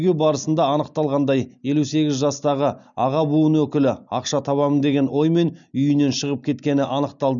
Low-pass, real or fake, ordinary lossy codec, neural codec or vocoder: 7.2 kHz; real; none; none